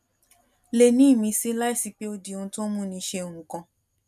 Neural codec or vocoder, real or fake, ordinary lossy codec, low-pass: none; real; none; 14.4 kHz